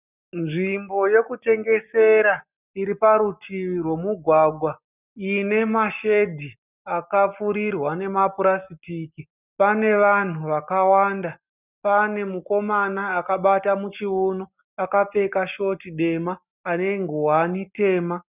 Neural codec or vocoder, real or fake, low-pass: none; real; 3.6 kHz